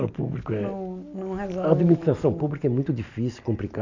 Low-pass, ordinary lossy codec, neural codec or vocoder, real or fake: 7.2 kHz; none; none; real